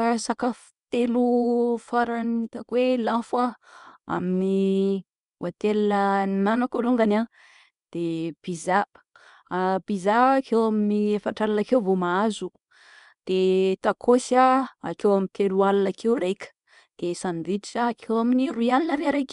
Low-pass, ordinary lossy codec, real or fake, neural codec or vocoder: 10.8 kHz; none; fake; codec, 24 kHz, 0.9 kbps, WavTokenizer, small release